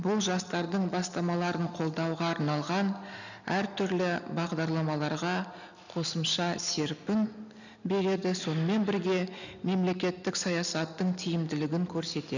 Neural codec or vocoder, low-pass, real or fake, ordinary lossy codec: none; 7.2 kHz; real; none